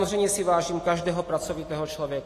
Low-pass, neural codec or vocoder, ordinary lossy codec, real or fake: 14.4 kHz; none; AAC, 48 kbps; real